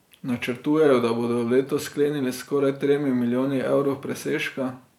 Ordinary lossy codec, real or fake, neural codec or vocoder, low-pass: none; fake; vocoder, 44.1 kHz, 128 mel bands every 512 samples, BigVGAN v2; 19.8 kHz